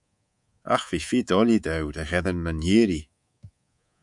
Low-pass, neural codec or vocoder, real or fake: 10.8 kHz; codec, 24 kHz, 3.1 kbps, DualCodec; fake